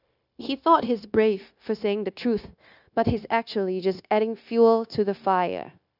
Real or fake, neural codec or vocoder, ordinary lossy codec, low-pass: fake; codec, 16 kHz, 0.9 kbps, LongCat-Audio-Codec; none; 5.4 kHz